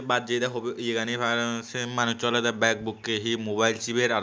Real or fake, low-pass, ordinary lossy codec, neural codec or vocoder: real; none; none; none